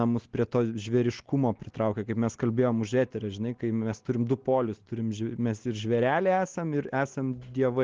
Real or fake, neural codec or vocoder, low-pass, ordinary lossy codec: real; none; 7.2 kHz; Opus, 16 kbps